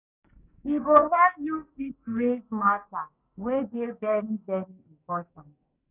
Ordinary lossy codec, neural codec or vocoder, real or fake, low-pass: none; codec, 44.1 kHz, 2.6 kbps, SNAC; fake; 3.6 kHz